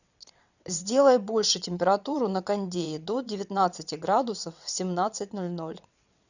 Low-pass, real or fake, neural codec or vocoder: 7.2 kHz; fake; vocoder, 22.05 kHz, 80 mel bands, WaveNeXt